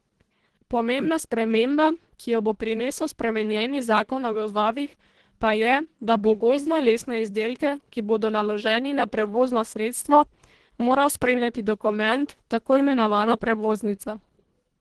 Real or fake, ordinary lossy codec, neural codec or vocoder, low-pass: fake; Opus, 16 kbps; codec, 24 kHz, 1.5 kbps, HILCodec; 10.8 kHz